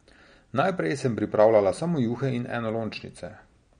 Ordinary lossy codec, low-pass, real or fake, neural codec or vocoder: MP3, 48 kbps; 9.9 kHz; real; none